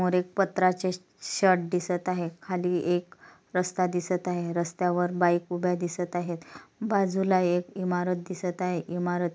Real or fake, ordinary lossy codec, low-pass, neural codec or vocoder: real; none; none; none